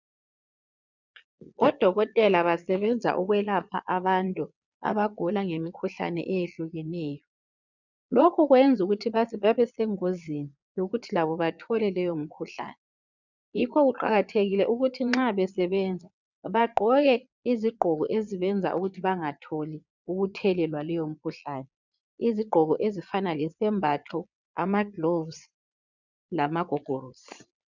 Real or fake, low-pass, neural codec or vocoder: fake; 7.2 kHz; vocoder, 22.05 kHz, 80 mel bands, Vocos